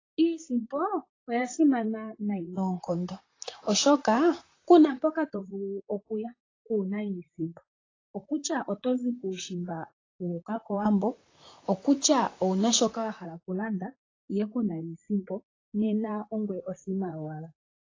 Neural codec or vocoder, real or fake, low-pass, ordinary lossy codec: vocoder, 44.1 kHz, 128 mel bands, Pupu-Vocoder; fake; 7.2 kHz; AAC, 32 kbps